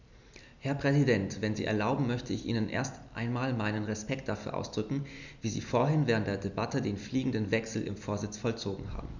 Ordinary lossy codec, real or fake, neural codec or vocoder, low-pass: none; real; none; 7.2 kHz